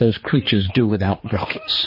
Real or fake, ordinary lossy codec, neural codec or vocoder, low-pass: fake; MP3, 24 kbps; codec, 16 kHz, 2 kbps, X-Codec, HuBERT features, trained on general audio; 5.4 kHz